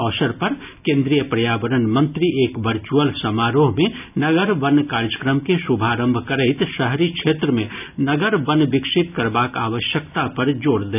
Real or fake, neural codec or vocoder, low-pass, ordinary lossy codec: real; none; 3.6 kHz; none